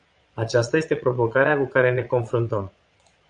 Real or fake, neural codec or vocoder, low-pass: fake; vocoder, 22.05 kHz, 80 mel bands, Vocos; 9.9 kHz